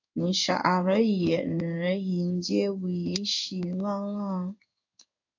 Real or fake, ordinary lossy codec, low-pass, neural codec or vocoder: fake; AAC, 48 kbps; 7.2 kHz; codec, 16 kHz in and 24 kHz out, 1 kbps, XY-Tokenizer